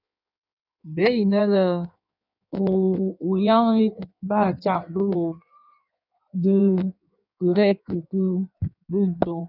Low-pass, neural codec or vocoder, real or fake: 5.4 kHz; codec, 16 kHz in and 24 kHz out, 1.1 kbps, FireRedTTS-2 codec; fake